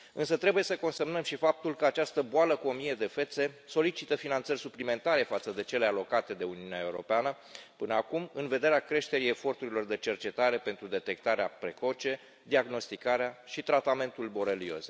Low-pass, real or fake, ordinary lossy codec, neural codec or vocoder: none; real; none; none